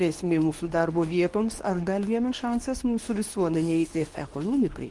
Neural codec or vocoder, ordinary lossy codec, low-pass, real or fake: codec, 24 kHz, 0.9 kbps, WavTokenizer, medium speech release version 2; Opus, 24 kbps; 10.8 kHz; fake